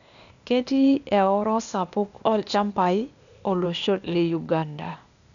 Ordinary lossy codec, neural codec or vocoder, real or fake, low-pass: none; codec, 16 kHz, 0.8 kbps, ZipCodec; fake; 7.2 kHz